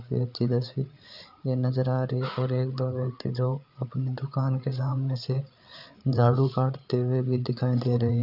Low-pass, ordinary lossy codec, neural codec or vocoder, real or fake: 5.4 kHz; none; codec, 16 kHz, 8 kbps, FreqCodec, larger model; fake